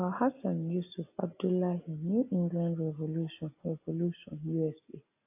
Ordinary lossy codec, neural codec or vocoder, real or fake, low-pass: AAC, 24 kbps; none; real; 3.6 kHz